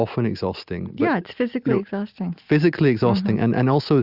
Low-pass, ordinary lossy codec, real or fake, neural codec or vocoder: 5.4 kHz; Opus, 64 kbps; real; none